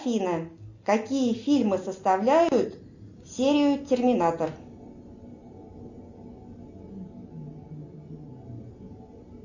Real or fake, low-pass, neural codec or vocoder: real; 7.2 kHz; none